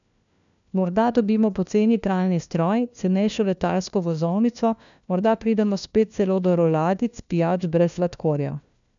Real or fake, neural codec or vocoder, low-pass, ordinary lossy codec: fake; codec, 16 kHz, 1 kbps, FunCodec, trained on LibriTTS, 50 frames a second; 7.2 kHz; none